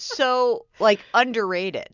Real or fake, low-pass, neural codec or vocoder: real; 7.2 kHz; none